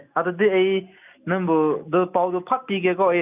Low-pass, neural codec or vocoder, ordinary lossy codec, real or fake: 3.6 kHz; none; none; real